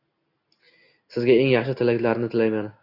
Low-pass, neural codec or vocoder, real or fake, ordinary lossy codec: 5.4 kHz; none; real; AAC, 32 kbps